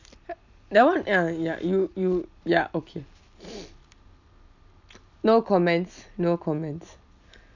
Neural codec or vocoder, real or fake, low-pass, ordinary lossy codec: none; real; 7.2 kHz; none